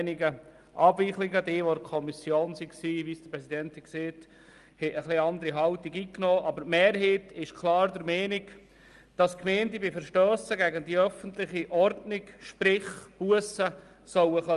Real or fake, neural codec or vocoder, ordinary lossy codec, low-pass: real; none; Opus, 32 kbps; 10.8 kHz